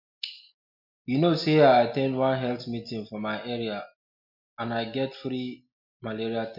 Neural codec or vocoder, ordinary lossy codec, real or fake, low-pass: none; MP3, 48 kbps; real; 5.4 kHz